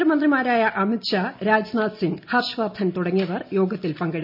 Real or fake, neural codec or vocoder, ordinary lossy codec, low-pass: real; none; none; 5.4 kHz